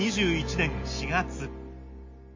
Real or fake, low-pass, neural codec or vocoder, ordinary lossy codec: real; 7.2 kHz; none; MP3, 32 kbps